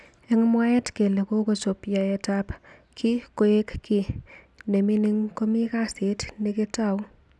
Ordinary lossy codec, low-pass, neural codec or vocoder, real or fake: none; none; none; real